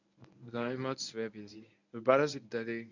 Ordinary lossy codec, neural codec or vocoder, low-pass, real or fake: none; codec, 24 kHz, 0.9 kbps, WavTokenizer, medium speech release version 1; 7.2 kHz; fake